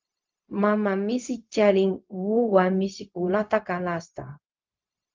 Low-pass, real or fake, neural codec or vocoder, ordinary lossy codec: 7.2 kHz; fake; codec, 16 kHz, 0.4 kbps, LongCat-Audio-Codec; Opus, 24 kbps